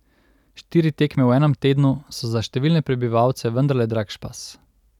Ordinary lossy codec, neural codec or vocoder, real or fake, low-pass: none; none; real; 19.8 kHz